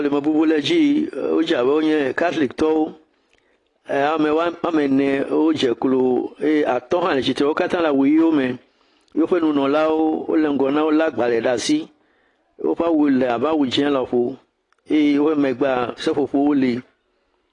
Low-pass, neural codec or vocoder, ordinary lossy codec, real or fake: 10.8 kHz; none; AAC, 32 kbps; real